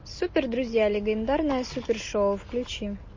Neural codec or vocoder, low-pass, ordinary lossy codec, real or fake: none; 7.2 kHz; MP3, 32 kbps; real